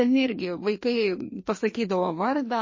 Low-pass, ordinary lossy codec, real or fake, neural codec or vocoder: 7.2 kHz; MP3, 32 kbps; fake; codec, 16 kHz, 2 kbps, FreqCodec, larger model